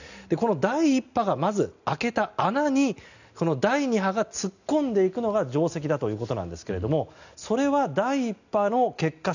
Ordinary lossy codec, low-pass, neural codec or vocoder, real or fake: none; 7.2 kHz; none; real